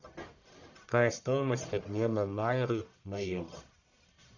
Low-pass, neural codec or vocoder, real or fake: 7.2 kHz; codec, 44.1 kHz, 1.7 kbps, Pupu-Codec; fake